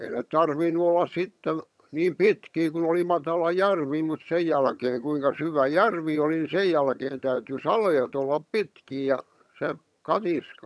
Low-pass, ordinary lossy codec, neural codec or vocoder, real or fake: none; none; vocoder, 22.05 kHz, 80 mel bands, HiFi-GAN; fake